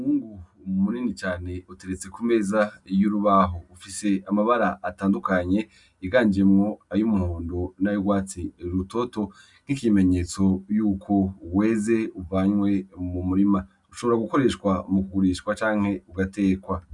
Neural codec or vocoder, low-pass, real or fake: none; 10.8 kHz; real